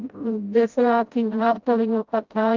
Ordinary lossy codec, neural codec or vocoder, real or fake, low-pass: Opus, 32 kbps; codec, 16 kHz, 0.5 kbps, FreqCodec, smaller model; fake; 7.2 kHz